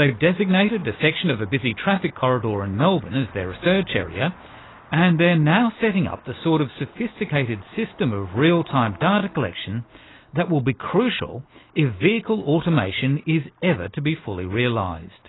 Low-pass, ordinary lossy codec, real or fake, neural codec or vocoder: 7.2 kHz; AAC, 16 kbps; real; none